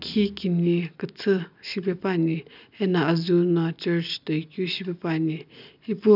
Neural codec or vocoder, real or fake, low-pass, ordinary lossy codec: none; real; 5.4 kHz; none